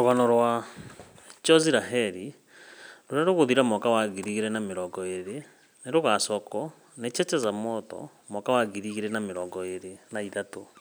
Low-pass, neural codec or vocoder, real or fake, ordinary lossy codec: none; none; real; none